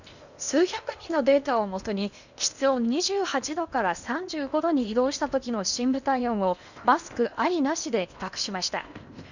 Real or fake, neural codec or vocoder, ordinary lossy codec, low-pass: fake; codec, 16 kHz in and 24 kHz out, 0.8 kbps, FocalCodec, streaming, 65536 codes; none; 7.2 kHz